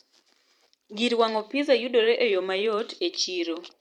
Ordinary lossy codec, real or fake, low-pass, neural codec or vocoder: MP3, 96 kbps; fake; 19.8 kHz; vocoder, 44.1 kHz, 128 mel bands every 512 samples, BigVGAN v2